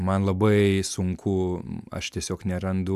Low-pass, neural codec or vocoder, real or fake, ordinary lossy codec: 14.4 kHz; none; real; AAC, 96 kbps